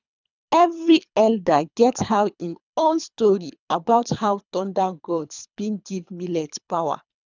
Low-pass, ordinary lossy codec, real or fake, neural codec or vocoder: 7.2 kHz; none; fake; codec, 24 kHz, 3 kbps, HILCodec